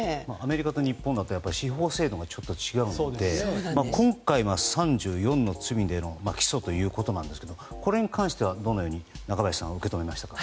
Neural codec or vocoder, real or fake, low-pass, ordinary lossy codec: none; real; none; none